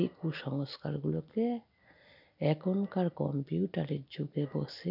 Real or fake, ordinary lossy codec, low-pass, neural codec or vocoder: real; none; 5.4 kHz; none